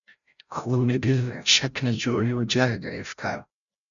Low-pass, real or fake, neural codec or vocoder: 7.2 kHz; fake; codec, 16 kHz, 0.5 kbps, FreqCodec, larger model